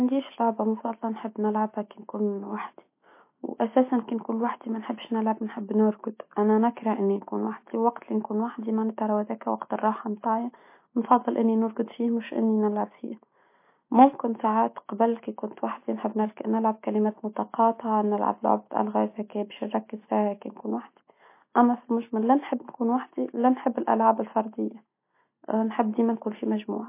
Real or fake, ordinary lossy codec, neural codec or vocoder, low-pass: real; MP3, 24 kbps; none; 3.6 kHz